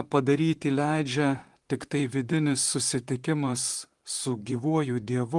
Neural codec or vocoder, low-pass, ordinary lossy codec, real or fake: vocoder, 44.1 kHz, 128 mel bands, Pupu-Vocoder; 10.8 kHz; Opus, 24 kbps; fake